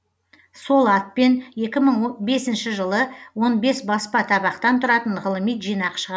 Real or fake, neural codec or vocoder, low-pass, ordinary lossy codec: real; none; none; none